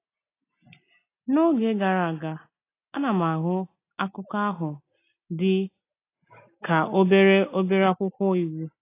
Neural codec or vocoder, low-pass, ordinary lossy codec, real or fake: none; 3.6 kHz; AAC, 24 kbps; real